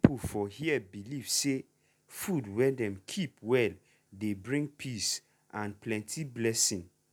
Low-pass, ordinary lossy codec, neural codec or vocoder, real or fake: 19.8 kHz; none; none; real